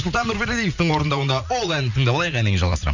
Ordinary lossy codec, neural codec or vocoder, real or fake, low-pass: none; vocoder, 44.1 kHz, 80 mel bands, Vocos; fake; 7.2 kHz